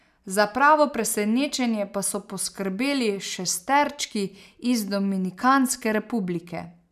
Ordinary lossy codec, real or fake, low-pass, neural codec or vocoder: none; real; 14.4 kHz; none